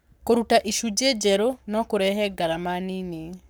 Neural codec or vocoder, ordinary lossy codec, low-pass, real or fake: codec, 44.1 kHz, 7.8 kbps, Pupu-Codec; none; none; fake